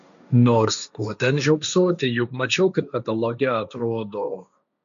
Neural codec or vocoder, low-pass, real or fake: codec, 16 kHz, 1.1 kbps, Voila-Tokenizer; 7.2 kHz; fake